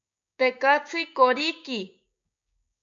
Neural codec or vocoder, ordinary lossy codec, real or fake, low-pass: codec, 16 kHz, 6 kbps, DAC; MP3, 96 kbps; fake; 7.2 kHz